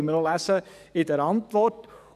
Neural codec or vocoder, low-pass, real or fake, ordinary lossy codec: codec, 44.1 kHz, 7.8 kbps, DAC; 14.4 kHz; fake; none